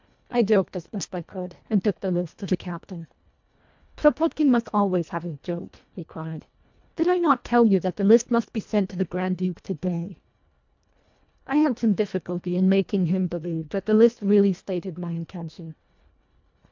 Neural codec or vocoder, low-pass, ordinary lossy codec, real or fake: codec, 24 kHz, 1.5 kbps, HILCodec; 7.2 kHz; MP3, 64 kbps; fake